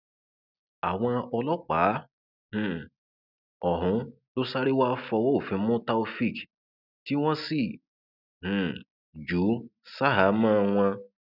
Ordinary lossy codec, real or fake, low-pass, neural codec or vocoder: none; real; 5.4 kHz; none